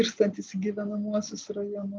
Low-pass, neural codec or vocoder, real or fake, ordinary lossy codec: 7.2 kHz; none; real; Opus, 24 kbps